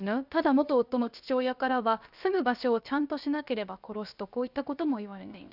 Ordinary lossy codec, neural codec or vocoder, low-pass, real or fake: none; codec, 16 kHz, about 1 kbps, DyCAST, with the encoder's durations; 5.4 kHz; fake